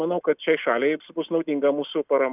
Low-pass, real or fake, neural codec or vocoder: 3.6 kHz; real; none